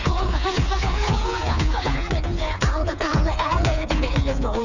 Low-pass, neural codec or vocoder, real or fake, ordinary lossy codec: 7.2 kHz; codec, 16 kHz, 4 kbps, FreqCodec, smaller model; fake; none